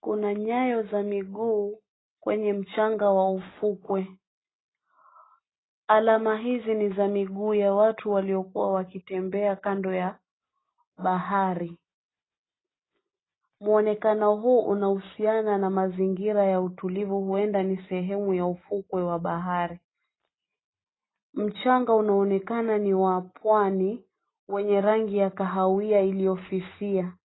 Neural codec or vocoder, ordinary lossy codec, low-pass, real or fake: none; AAC, 16 kbps; 7.2 kHz; real